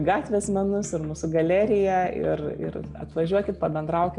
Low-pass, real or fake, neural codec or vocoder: 10.8 kHz; real; none